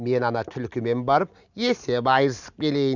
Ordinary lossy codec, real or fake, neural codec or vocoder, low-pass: none; real; none; 7.2 kHz